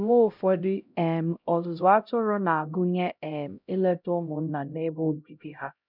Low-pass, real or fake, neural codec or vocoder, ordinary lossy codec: 5.4 kHz; fake; codec, 16 kHz, 0.5 kbps, X-Codec, HuBERT features, trained on LibriSpeech; none